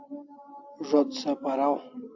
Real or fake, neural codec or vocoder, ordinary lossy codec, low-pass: real; none; AAC, 48 kbps; 7.2 kHz